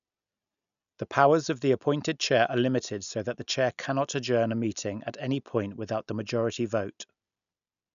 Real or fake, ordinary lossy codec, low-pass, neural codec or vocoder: real; none; 7.2 kHz; none